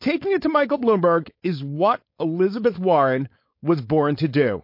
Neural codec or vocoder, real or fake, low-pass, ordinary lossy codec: codec, 16 kHz, 4.8 kbps, FACodec; fake; 5.4 kHz; MP3, 32 kbps